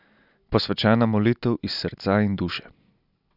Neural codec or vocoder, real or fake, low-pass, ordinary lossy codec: none; real; 5.4 kHz; none